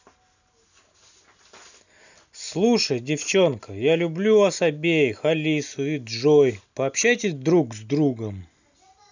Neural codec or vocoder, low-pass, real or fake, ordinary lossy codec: none; 7.2 kHz; real; none